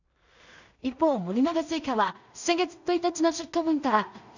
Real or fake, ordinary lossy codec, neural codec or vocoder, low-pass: fake; none; codec, 16 kHz in and 24 kHz out, 0.4 kbps, LongCat-Audio-Codec, two codebook decoder; 7.2 kHz